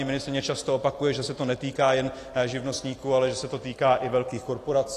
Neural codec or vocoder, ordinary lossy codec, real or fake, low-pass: none; AAC, 48 kbps; real; 14.4 kHz